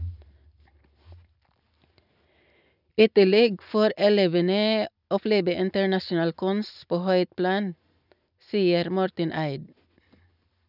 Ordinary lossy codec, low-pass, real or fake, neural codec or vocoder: none; 5.4 kHz; real; none